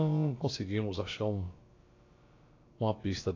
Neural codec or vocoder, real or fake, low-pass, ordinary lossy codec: codec, 16 kHz, about 1 kbps, DyCAST, with the encoder's durations; fake; 7.2 kHz; AAC, 32 kbps